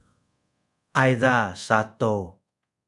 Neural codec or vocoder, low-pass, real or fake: codec, 24 kHz, 0.5 kbps, DualCodec; 10.8 kHz; fake